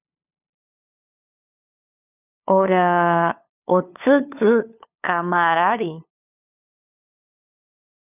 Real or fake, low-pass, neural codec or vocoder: fake; 3.6 kHz; codec, 16 kHz, 2 kbps, FunCodec, trained on LibriTTS, 25 frames a second